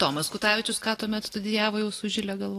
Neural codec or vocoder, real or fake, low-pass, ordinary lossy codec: none; real; 14.4 kHz; AAC, 48 kbps